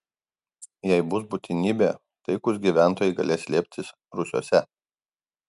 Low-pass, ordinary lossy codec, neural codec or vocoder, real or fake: 10.8 kHz; MP3, 96 kbps; none; real